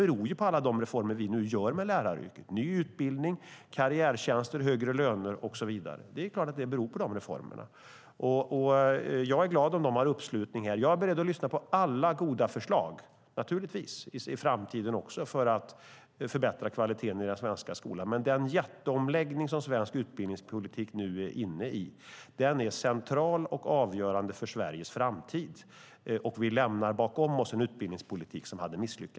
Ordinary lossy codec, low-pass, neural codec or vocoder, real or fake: none; none; none; real